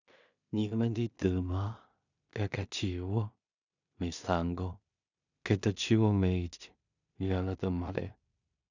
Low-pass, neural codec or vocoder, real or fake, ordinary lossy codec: 7.2 kHz; codec, 16 kHz in and 24 kHz out, 0.4 kbps, LongCat-Audio-Codec, two codebook decoder; fake; none